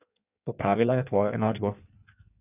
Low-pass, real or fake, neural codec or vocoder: 3.6 kHz; fake; codec, 16 kHz in and 24 kHz out, 1.1 kbps, FireRedTTS-2 codec